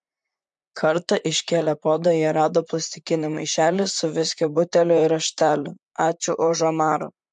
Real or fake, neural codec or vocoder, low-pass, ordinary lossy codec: fake; vocoder, 44.1 kHz, 128 mel bands, Pupu-Vocoder; 10.8 kHz; MP3, 64 kbps